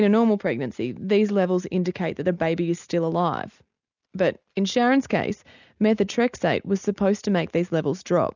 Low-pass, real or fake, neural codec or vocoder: 7.2 kHz; real; none